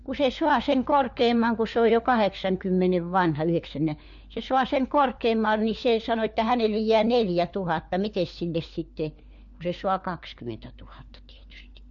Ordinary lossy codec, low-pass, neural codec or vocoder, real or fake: MP3, 48 kbps; 7.2 kHz; codec, 16 kHz, 4 kbps, FunCodec, trained on LibriTTS, 50 frames a second; fake